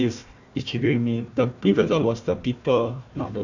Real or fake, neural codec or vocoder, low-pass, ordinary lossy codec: fake; codec, 16 kHz, 1 kbps, FunCodec, trained on Chinese and English, 50 frames a second; 7.2 kHz; none